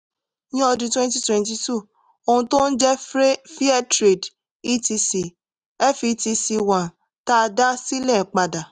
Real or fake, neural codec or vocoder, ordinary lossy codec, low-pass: real; none; none; 10.8 kHz